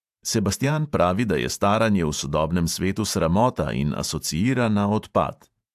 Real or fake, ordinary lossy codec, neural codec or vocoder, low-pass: fake; AAC, 96 kbps; vocoder, 48 kHz, 128 mel bands, Vocos; 14.4 kHz